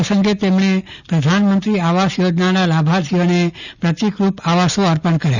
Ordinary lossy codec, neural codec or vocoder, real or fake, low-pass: none; none; real; 7.2 kHz